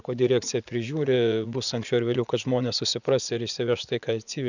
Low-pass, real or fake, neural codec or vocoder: 7.2 kHz; fake; vocoder, 44.1 kHz, 80 mel bands, Vocos